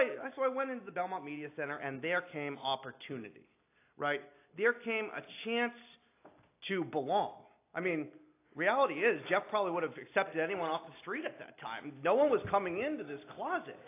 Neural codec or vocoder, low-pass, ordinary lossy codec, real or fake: none; 3.6 kHz; AAC, 24 kbps; real